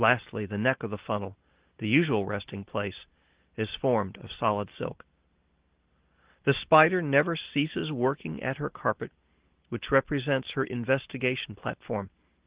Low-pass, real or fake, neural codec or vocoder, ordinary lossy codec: 3.6 kHz; real; none; Opus, 16 kbps